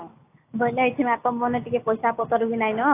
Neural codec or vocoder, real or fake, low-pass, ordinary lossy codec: none; real; 3.6 kHz; AAC, 24 kbps